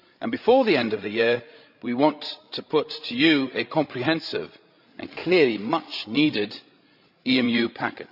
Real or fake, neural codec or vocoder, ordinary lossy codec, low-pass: fake; codec, 16 kHz, 16 kbps, FreqCodec, larger model; none; 5.4 kHz